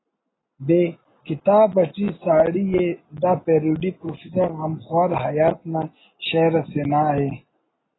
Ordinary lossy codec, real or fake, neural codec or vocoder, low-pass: AAC, 16 kbps; real; none; 7.2 kHz